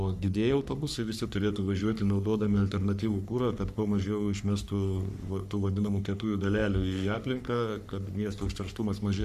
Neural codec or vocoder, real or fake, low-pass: codec, 44.1 kHz, 3.4 kbps, Pupu-Codec; fake; 14.4 kHz